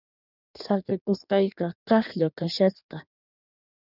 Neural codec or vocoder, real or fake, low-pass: codec, 16 kHz in and 24 kHz out, 1.1 kbps, FireRedTTS-2 codec; fake; 5.4 kHz